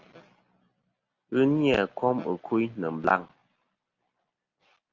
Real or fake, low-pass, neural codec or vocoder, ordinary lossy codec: real; 7.2 kHz; none; Opus, 32 kbps